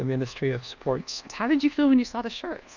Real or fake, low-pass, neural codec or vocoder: fake; 7.2 kHz; codec, 24 kHz, 1.2 kbps, DualCodec